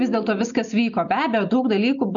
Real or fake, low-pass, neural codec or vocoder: real; 7.2 kHz; none